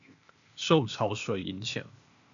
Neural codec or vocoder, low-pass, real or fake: codec, 16 kHz, 0.8 kbps, ZipCodec; 7.2 kHz; fake